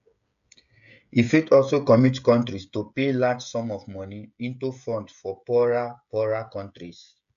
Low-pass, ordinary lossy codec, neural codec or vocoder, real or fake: 7.2 kHz; AAC, 96 kbps; codec, 16 kHz, 16 kbps, FreqCodec, smaller model; fake